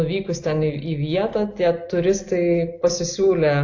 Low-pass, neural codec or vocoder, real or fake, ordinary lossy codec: 7.2 kHz; none; real; AAC, 48 kbps